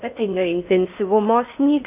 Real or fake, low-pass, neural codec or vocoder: fake; 3.6 kHz; codec, 16 kHz in and 24 kHz out, 0.6 kbps, FocalCodec, streaming, 4096 codes